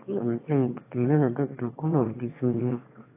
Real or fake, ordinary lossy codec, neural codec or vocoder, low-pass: fake; AAC, 24 kbps; autoencoder, 22.05 kHz, a latent of 192 numbers a frame, VITS, trained on one speaker; 3.6 kHz